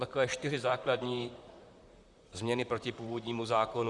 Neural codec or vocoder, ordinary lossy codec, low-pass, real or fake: vocoder, 44.1 kHz, 128 mel bands, Pupu-Vocoder; Opus, 64 kbps; 10.8 kHz; fake